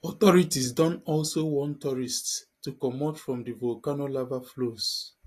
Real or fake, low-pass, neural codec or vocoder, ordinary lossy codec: real; 14.4 kHz; none; AAC, 48 kbps